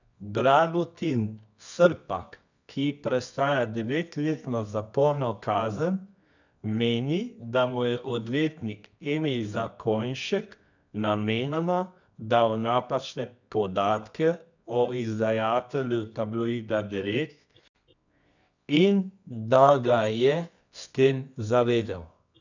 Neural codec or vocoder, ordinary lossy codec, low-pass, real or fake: codec, 24 kHz, 0.9 kbps, WavTokenizer, medium music audio release; none; 7.2 kHz; fake